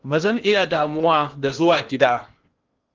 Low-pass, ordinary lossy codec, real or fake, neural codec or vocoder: 7.2 kHz; Opus, 32 kbps; fake; codec, 16 kHz in and 24 kHz out, 0.8 kbps, FocalCodec, streaming, 65536 codes